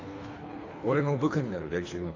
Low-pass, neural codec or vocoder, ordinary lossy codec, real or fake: 7.2 kHz; codec, 24 kHz, 3 kbps, HILCodec; AAC, 32 kbps; fake